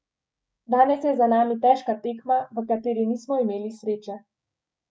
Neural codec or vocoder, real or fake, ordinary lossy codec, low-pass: codec, 16 kHz, 6 kbps, DAC; fake; none; none